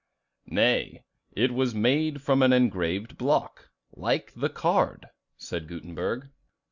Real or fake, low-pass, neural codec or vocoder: real; 7.2 kHz; none